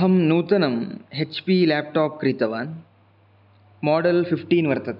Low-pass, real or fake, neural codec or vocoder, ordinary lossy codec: 5.4 kHz; real; none; none